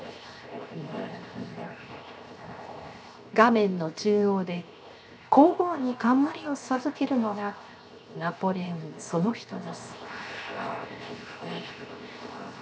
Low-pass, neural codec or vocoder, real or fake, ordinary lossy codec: none; codec, 16 kHz, 0.7 kbps, FocalCodec; fake; none